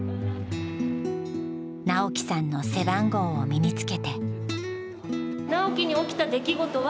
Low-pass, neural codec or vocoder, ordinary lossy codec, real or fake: none; none; none; real